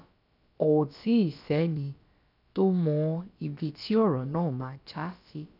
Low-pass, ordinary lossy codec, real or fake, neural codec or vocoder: 5.4 kHz; none; fake; codec, 16 kHz, about 1 kbps, DyCAST, with the encoder's durations